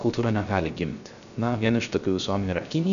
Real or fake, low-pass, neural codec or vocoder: fake; 7.2 kHz; codec, 16 kHz, 0.3 kbps, FocalCodec